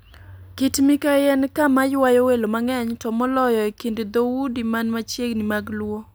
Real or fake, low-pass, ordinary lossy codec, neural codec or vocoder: real; none; none; none